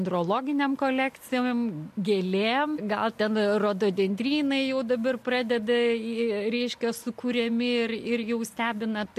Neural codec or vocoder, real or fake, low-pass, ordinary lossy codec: none; real; 14.4 kHz; MP3, 64 kbps